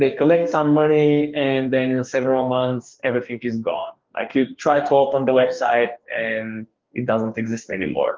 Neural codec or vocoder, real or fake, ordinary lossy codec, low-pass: codec, 44.1 kHz, 2.6 kbps, DAC; fake; Opus, 32 kbps; 7.2 kHz